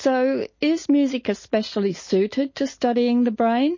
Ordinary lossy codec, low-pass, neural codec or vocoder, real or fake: MP3, 32 kbps; 7.2 kHz; none; real